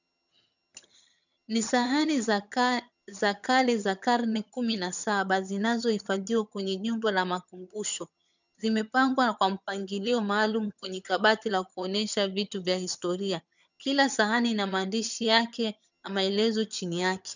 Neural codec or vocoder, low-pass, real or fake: vocoder, 22.05 kHz, 80 mel bands, HiFi-GAN; 7.2 kHz; fake